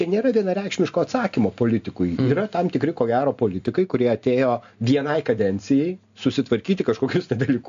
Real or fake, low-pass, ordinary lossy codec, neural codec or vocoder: real; 7.2 kHz; AAC, 64 kbps; none